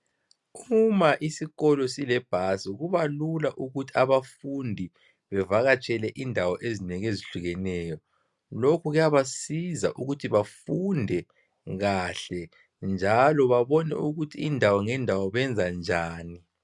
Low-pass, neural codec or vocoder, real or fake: 10.8 kHz; none; real